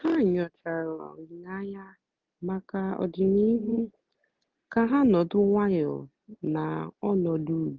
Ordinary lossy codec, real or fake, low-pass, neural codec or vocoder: Opus, 32 kbps; real; 7.2 kHz; none